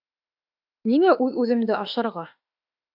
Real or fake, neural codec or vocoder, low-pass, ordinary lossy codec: fake; autoencoder, 48 kHz, 32 numbers a frame, DAC-VAE, trained on Japanese speech; 5.4 kHz; AAC, 48 kbps